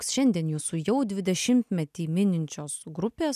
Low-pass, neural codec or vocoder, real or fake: 14.4 kHz; none; real